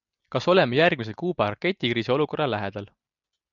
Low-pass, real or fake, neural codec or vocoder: 7.2 kHz; real; none